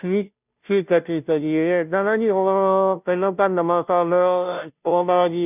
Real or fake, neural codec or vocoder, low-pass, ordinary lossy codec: fake; codec, 16 kHz, 0.5 kbps, FunCodec, trained on Chinese and English, 25 frames a second; 3.6 kHz; none